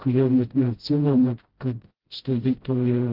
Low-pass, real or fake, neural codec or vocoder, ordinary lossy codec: 5.4 kHz; fake; codec, 16 kHz, 0.5 kbps, FreqCodec, smaller model; Opus, 16 kbps